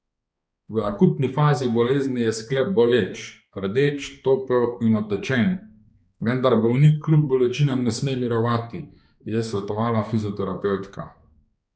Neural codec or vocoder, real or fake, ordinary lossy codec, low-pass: codec, 16 kHz, 2 kbps, X-Codec, HuBERT features, trained on balanced general audio; fake; none; none